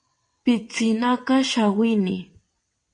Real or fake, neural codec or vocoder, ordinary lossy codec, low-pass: fake; vocoder, 22.05 kHz, 80 mel bands, WaveNeXt; MP3, 48 kbps; 9.9 kHz